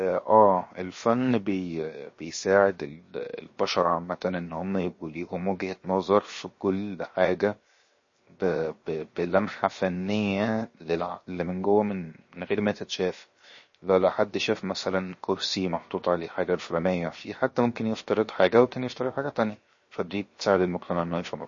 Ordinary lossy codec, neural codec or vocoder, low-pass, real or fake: MP3, 32 kbps; codec, 16 kHz, 0.7 kbps, FocalCodec; 7.2 kHz; fake